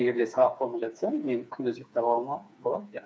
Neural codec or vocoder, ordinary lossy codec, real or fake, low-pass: codec, 16 kHz, 4 kbps, FreqCodec, smaller model; none; fake; none